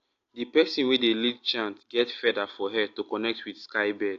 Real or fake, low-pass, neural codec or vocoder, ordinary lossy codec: real; 7.2 kHz; none; AAC, 48 kbps